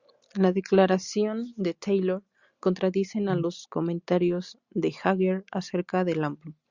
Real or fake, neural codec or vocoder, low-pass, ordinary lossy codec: real; none; 7.2 kHz; Opus, 64 kbps